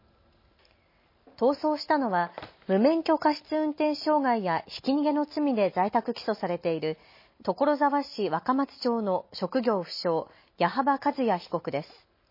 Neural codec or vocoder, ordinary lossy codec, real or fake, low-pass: none; MP3, 24 kbps; real; 5.4 kHz